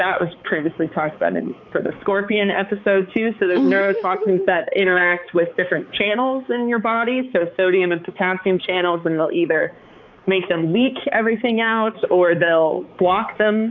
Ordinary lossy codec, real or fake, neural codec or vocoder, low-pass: AAC, 48 kbps; fake; codec, 16 kHz, 4 kbps, X-Codec, HuBERT features, trained on balanced general audio; 7.2 kHz